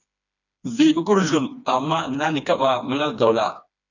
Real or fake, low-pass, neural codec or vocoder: fake; 7.2 kHz; codec, 16 kHz, 2 kbps, FreqCodec, smaller model